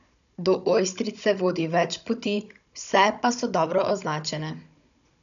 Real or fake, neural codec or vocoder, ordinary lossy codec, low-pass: fake; codec, 16 kHz, 16 kbps, FunCodec, trained on Chinese and English, 50 frames a second; none; 7.2 kHz